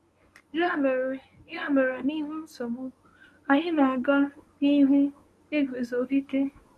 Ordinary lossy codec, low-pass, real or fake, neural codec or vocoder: none; none; fake; codec, 24 kHz, 0.9 kbps, WavTokenizer, medium speech release version 1